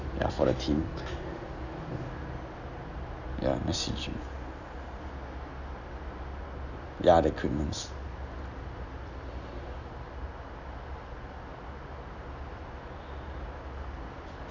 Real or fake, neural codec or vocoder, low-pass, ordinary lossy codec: real; none; 7.2 kHz; none